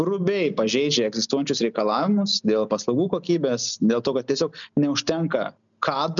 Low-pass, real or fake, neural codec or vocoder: 7.2 kHz; real; none